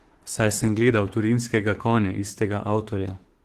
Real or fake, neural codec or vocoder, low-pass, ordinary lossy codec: fake; autoencoder, 48 kHz, 32 numbers a frame, DAC-VAE, trained on Japanese speech; 14.4 kHz; Opus, 16 kbps